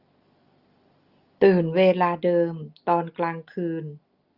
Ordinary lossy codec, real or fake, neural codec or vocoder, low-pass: Opus, 24 kbps; real; none; 5.4 kHz